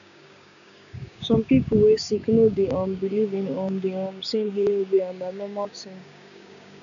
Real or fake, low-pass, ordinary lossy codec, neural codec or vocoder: fake; 7.2 kHz; none; codec, 16 kHz, 6 kbps, DAC